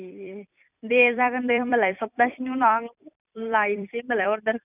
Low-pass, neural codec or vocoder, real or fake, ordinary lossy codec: 3.6 kHz; vocoder, 44.1 kHz, 128 mel bands every 256 samples, BigVGAN v2; fake; none